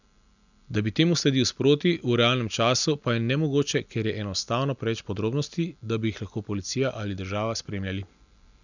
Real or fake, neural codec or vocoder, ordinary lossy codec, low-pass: real; none; none; 7.2 kHz